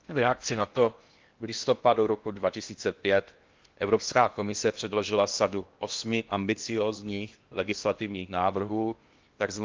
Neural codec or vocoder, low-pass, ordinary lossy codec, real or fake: codec, 16 kHz in and 24 kHz out, 0.8 kbps, FocalCodec, streaming, 65536 codes; 7.2 kHz; Opus, 32 kbps; fake